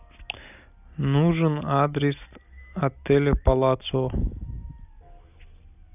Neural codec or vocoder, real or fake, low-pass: none; real; 3.6 kHz